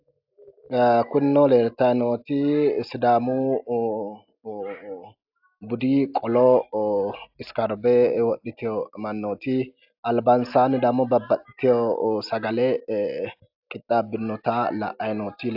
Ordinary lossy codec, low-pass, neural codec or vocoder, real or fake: AAC, 48 kbps; 5.4 kHz; none; real